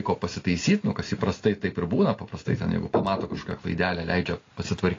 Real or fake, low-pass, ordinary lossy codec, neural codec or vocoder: real; 7.2 kHz; AAC, 32 kbps; none